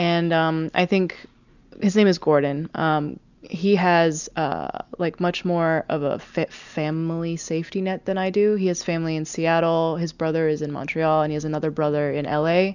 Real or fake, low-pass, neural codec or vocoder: real; 7.2 kHz; none